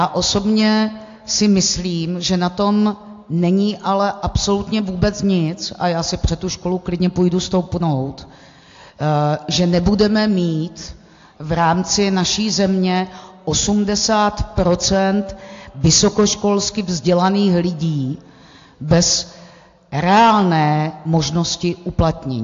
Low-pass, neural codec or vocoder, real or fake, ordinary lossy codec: 7.2 kHz; none; real; AAC, 48 kbps